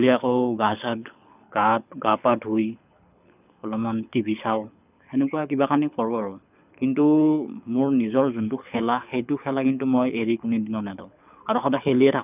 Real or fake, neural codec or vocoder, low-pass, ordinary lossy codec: fake; codec, 24 kHz, 6 kbps, HILCodec; 3.6 kHz; none